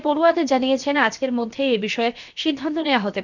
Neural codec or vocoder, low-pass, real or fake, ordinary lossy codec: codec, 16 kHz, 0.7 kbps, FocalCodec; 7.2 kHz; fake; none